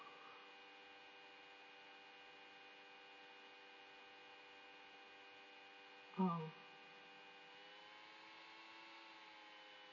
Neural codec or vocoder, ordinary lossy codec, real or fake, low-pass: none; MP3, 32 kbps; real; 7.2 kHz